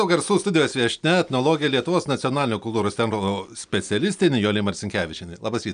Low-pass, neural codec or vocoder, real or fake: 9.9 kHz; none; real